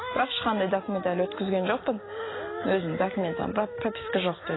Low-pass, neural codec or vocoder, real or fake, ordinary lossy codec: 7.2 kHz; none; real; AAC, 16 kbps